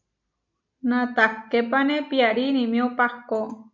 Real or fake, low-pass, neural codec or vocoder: real; 7.2 kHz; none